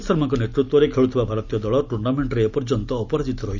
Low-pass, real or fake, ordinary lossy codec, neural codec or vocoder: 7.2 kHz; real; none; none